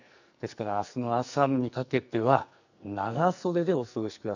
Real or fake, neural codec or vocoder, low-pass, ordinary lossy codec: fake; codec, 44.1 kHz, 2.6 kbps, SNAC; 7.2 kHz; none